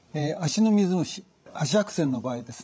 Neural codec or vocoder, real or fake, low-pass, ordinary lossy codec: codec, 16 kHz, 16 kbps, FreqCodec, larger model; fake; none; none